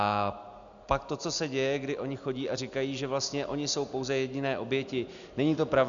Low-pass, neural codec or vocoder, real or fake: 7.2 kHz; none; real